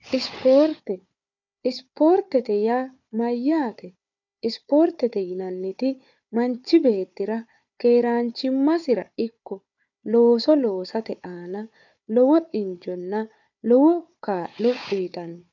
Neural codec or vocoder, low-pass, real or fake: codec, 16 kHz, 4 kbps, FunCodec, trained on Chinese and English, 50 frames a second; 7.2 kHz; fake